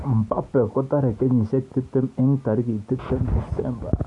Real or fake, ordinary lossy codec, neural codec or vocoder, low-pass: real; none; none; 10.8 kHz